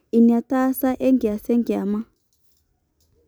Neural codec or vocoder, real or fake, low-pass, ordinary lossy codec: none; real; none; none